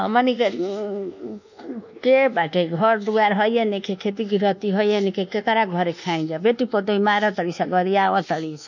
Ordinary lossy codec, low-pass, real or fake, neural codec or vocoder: none; 7.2 kHz; fake; codec, 24 kHz, 1.2 kbps, DualCodec